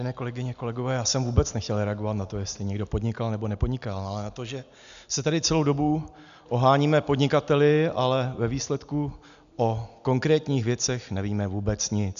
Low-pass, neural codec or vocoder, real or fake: 7.2 kHz; none; real